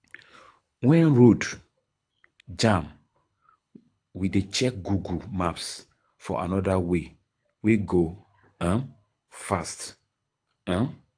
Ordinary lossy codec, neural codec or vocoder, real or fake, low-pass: AAC, 64 kbps; codec, 24 kHz, 6 kbps, HILCodec; fake; 9.9 kHz